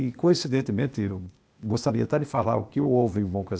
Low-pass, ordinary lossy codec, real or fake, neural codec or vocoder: none; none; fake; codec, 16 kHz, 0.8 kbps, ZipCodec